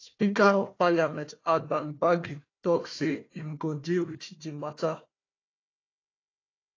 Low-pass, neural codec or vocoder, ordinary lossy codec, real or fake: 7.2 kHz; codec, 16 kHz, 1 kbps, FunCodec, trained on Chinese and English, 50 frames a second; AAC, 32 kbps; fake